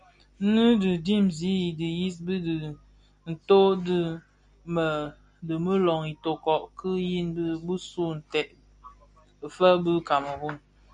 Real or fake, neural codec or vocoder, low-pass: real; none; 9.9 kHz